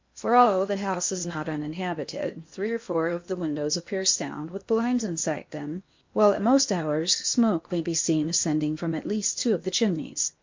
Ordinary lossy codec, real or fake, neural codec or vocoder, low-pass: MP3, 48 kbps; fake; codec, 16 kHz in and 24 kHz out, 0.8 kbps, FocalCodec, streaming, 65536 codes; 7.2 kHz